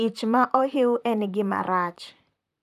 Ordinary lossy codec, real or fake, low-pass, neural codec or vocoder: none; fake; 14.4 kHz; vocoder, 44.1 kHz, 128 mel bands, Pupu-Vocoder